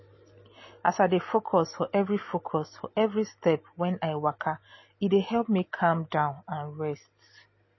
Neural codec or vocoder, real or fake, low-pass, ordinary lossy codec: none; real; 7.2 kHz; MP3, 24 kbps